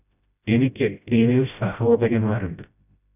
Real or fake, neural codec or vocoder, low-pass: fake; codec, 16 kHz, 0.5 kbps, FreqCodec, smaller model; 3.6 kHz